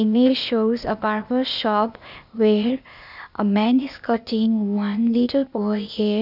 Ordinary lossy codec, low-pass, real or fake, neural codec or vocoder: none; 5.4 kHz; fake; codec, 16 kHz, 0.8 kbps, ZipCodec